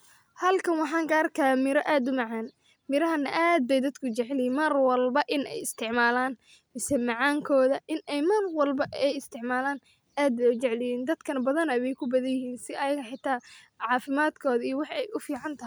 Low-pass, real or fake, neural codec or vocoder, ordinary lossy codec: none; real; none; none